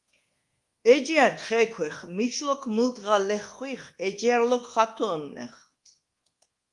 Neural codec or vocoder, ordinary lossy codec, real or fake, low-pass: codec, 24 kHz, 1.2 kbps, DualCodec; Opus, 24 kbps; fake; 10.8 kHz